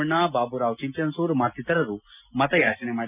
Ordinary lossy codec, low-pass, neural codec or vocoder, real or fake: none; 3.6 kHz; none; real